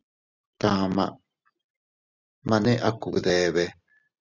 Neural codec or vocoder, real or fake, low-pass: none; real; 7.2 kHz